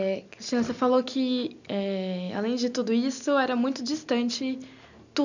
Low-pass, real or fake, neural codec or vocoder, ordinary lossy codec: 7.2 kHz; real; none; none